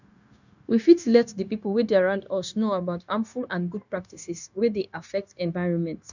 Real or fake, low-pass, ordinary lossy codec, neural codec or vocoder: fake; 7.2 kHz; none; codec, 16 kHz, 0.9 kbps, LongCat-Audio-Codec